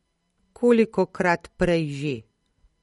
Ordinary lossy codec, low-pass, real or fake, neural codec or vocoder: MP3, 48 kbps; 19.8 kHz; real; none